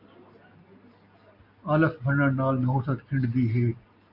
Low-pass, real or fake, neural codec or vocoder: 5.4 kHz; real; none